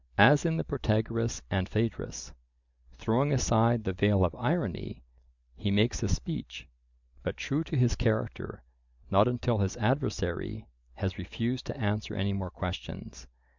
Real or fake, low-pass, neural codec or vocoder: real; 7.2 kHz; none